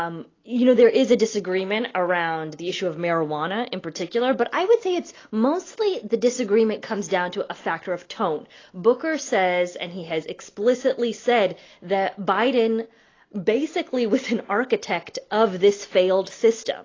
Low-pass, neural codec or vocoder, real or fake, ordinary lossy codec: 7.2 kHz; none; real; AAC, 32 kbps